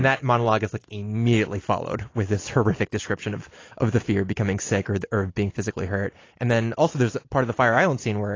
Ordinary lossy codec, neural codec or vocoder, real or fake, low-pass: AAC, 32 kbps; none; real; 7.2 kHz